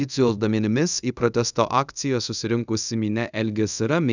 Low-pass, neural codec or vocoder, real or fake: 7.2 kHz; codec, 24 kHz, 0.5 kbps, DualCodec; fake